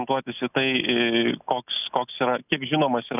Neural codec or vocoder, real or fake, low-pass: none; real; 3.6 kHz